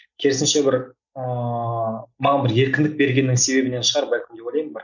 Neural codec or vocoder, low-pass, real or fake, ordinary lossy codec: none; 7.2 kHz; real; none